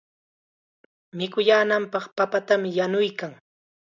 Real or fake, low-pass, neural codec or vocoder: real; 7.2 kHz; none